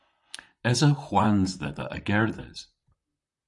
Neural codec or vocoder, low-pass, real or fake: vocoder, 44.1 kHz, 128 mel bands, Pupu-Vocoder; 10.8 kHz; fake